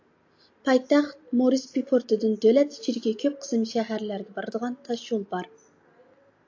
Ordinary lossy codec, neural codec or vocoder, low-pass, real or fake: AAC, 48 kbps; none; 7.2 kHz; real